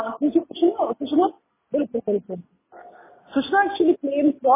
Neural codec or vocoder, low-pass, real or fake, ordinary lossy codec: none; 3.6 kHz; real; MP3, 16 kbps